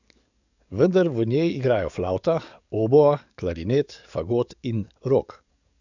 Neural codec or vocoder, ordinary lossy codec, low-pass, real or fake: codec, 44.1 kHz, 7.8 kbps, DAC; none; 7.2 kHz; fake